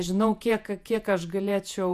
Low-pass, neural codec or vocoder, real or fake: 14.4 kHz; vocoder, 48 kHz, 128 mel bands, Vocos; fake